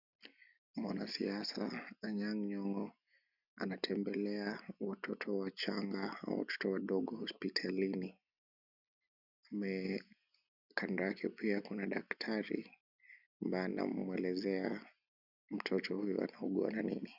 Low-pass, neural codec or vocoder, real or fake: 5.4 kHz; none; real